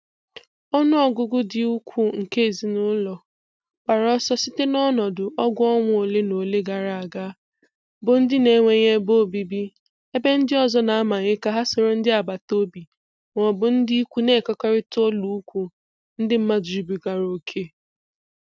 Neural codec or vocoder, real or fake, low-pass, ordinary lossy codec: none; real; none; none